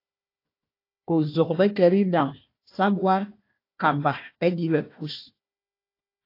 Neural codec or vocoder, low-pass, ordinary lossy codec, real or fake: codec, 16 kHz, 1 kbps, FunCodec, trained on Chinese and English, 50 frames a second; 5.4 kHz; AAC, 32 kbps; fake